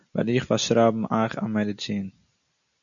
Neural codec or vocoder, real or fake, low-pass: none; real; 7.2 kHz